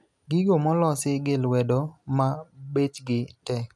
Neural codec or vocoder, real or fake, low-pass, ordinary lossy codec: none; real; none; none